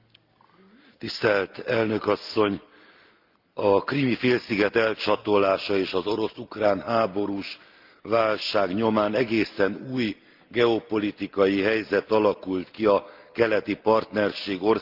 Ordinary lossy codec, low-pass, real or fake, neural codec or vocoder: Opus, 32 kbps; 5.4 kHz; real; none